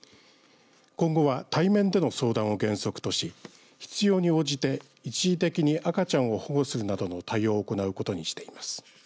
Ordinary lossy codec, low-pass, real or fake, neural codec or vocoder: none; none; real; none